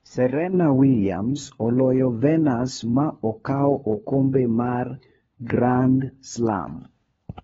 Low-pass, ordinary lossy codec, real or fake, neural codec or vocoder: 7.2 kHz; AAC, 24 kbps; fake; codec, 16 kHz, 4 kbps, FunCodec, trained on LibriTTS, 50 frames a second